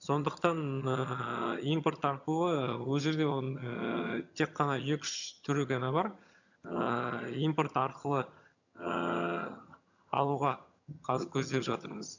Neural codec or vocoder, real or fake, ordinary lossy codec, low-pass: vocoder, 22.05 kHz, 80 mel bands, HiFi-GAN; fake; none; 7.2 kHz